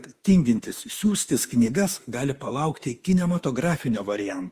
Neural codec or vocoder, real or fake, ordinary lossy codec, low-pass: autoencoder, 48 kHz, 32 numbers a frame, DAC-VAE, trained on Japanese speech; fake; Opus, 32 kbps; 14.4 kHz